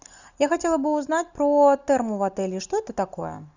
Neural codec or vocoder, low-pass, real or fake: none; 7.2 kHz; real